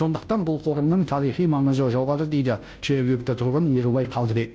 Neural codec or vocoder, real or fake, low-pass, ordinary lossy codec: codec, 16 kHz, 0.5 kbps, FunCodec, trained on Chinese and English, 25 frames a second; fake; none; none